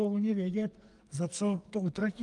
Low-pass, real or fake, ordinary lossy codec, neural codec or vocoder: 10.8 kHz; fake; Opus, 32 kbps; codec, 44.1 kHz, 2.6 kbps, SNAC